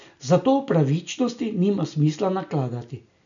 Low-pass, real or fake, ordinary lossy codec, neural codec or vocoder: 7.2 kHz; real; none; none